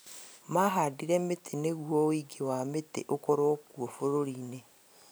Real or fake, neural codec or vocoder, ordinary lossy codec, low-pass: real; none; none; none